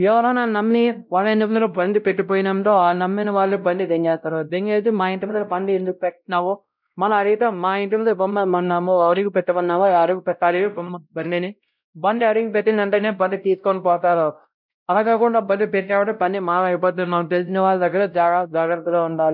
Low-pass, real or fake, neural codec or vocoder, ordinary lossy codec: 5.4 kHz; fake; codec, 16 kHz, 0.5 kbps, X-Codec, WavLM features, trained on Multilingual LibriSpeech; none